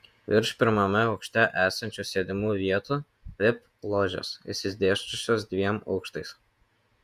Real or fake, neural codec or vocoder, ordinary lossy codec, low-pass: fake; vocoder, 48 kHz, 128 mel bands, Vocos; Opus, 64 kbps; 14.4 kHz